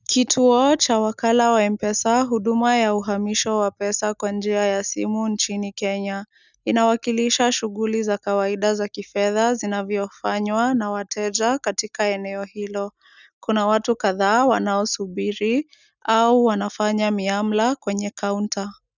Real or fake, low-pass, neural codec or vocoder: real; 7.2 kHz; none